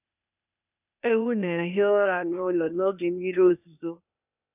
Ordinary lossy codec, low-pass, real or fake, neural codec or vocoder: none; 3.6 kHz; fake; codec, 16 kHz, 0.8 kbps, ZipCodec